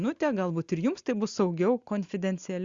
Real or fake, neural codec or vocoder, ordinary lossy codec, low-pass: real; none; Opus, 64 kbps; 7.2 kHz